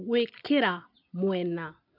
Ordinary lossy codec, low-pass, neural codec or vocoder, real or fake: none; 5.4 kHz; none; real